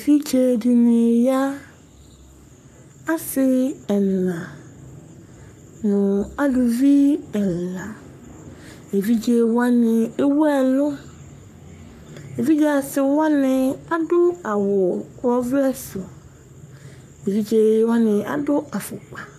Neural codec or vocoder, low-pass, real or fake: codec, 44.1 kHz, 3.4 kbps, Pupu-Codec; 14.4 kHz; fake